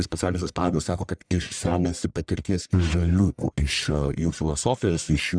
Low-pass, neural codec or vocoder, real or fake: 9.9 kHz; codec, 44.1 kHz, 1.7 kbps, Pupu-Codec; fake